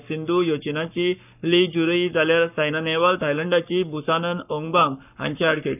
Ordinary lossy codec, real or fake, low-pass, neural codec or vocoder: none; fake; 3.6 kHz; codec, 44.1 kHz, 7.8 kbps, Pupu-Codec